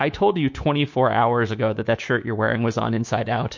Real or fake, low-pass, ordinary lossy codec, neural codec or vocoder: fake; 7.2 kHz; MP3, 48 kbps; codec, 24 kHz, 3.1 kbps, DualCodec